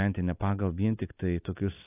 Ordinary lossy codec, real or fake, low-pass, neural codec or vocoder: AAC, 24 kbps; real; 3.6 kHz; none